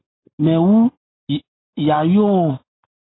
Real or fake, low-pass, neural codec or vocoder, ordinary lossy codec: real; 7.2 kHz; none; AAC, 16 kbps